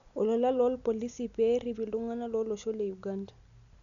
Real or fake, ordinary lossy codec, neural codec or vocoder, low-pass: real; none; none; 7.2 kHz